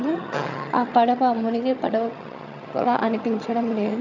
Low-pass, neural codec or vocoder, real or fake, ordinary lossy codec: 7.2 kHz; vocoder, 22.05 kHz, 80 mel bands, HiFi-GAN; fake; none